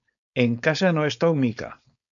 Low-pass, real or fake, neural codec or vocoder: 7.2 kHz; fake; codec, 16 kHz, 4.8 kbps, FACodec